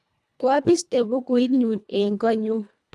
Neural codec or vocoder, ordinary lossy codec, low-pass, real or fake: codec, 24 kHz, 1.5 kbps, HILCodec; none; none; fake